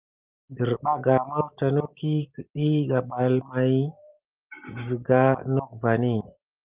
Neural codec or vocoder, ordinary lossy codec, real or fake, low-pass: none; Opus, 24 kbps; real; 3.6 kHz